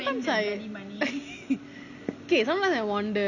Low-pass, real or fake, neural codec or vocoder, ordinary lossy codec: 7.2 kHz; real; none; Opus, 64 kbps